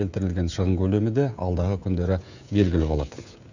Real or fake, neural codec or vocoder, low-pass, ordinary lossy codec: fake; codec, 16 kHz, 16 kbps, FreqCodec, smaller model; 7.2 kHz; none